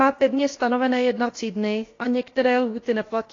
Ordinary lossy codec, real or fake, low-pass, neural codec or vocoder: AAC, 32 kbps; fake; 7.2 kHz; codec, 16 kHz, about 1 kbps, DyCAST, with the encoder's durations